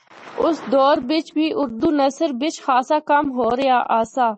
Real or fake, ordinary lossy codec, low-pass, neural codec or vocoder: real; MP3, 32 kbps; 9.9 kHz; none